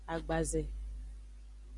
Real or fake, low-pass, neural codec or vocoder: real; 10.8 kHz; none